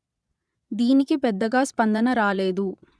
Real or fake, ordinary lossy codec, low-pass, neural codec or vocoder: fake; none; none; vocoder, 22.05 kHz, 80 mel bands, Vocos